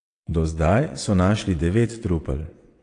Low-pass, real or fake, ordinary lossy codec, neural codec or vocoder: 9.9 kHz; fake; AAC, 48 kbps; vocoder, 22.05 kHz, 80 mel bands, WaveNeXt